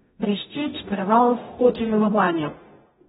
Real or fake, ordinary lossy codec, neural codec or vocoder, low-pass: fake; AAC, 16 kbps; codec, 44.1 kHz, 0.9 kbps, DAC; 19.8 kHz